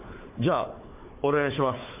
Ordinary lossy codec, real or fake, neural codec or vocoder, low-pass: MP3, 24 kbps; fake; codec, 16 kHz, 4 kbps, FunCodec, trained on Chinese and English, 50 frames a second; 3.6 kHz